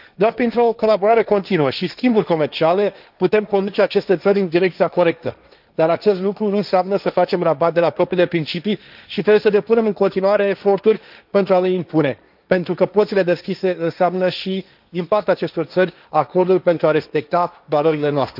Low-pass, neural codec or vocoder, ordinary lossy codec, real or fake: 5.4 kHz; codec, 16 kHz, 1.1 kbps, Voila-Tokenizer; none; fake